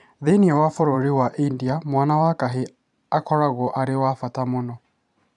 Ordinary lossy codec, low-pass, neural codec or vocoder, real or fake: none; 10.8 kHz; vocoder, 44.1 kHz, 128 mel bands every 512 samples, BigVGAN v2; fake